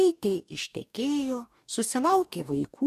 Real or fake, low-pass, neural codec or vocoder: fake; 14.4 kHz; codec, 44.1 kHz, 2.6 kbps, DAC